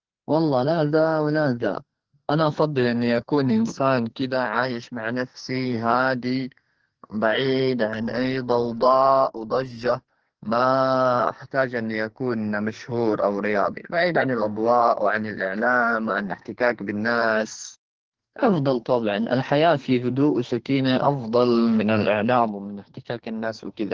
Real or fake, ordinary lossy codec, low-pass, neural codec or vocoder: fake; Opus, 16 kbps; 7.2 kHz; codec, 44.1 kHz, 2.6 kbps, SNAC